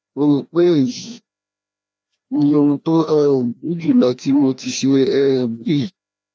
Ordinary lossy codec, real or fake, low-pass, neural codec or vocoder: none; fake; none; codec, 16 kHz, 1 kbps, FreqCodec, larger model